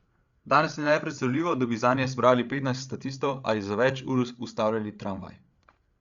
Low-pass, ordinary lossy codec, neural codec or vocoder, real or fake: 7.2 kHz; Opus, 64 kbps; codec, 16 kHz, 8 kbps, FreqCodec, larger model; fake